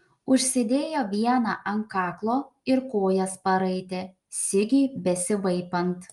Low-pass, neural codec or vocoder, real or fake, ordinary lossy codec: 10.8 kHz; none; real; Opus, 32 kbps